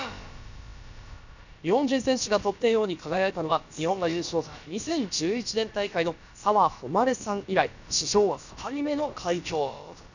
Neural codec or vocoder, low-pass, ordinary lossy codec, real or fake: codec, 16 kHz, about 1 kbps, DyCAST, with the encoder's durations; 7.2 kHz; none; fake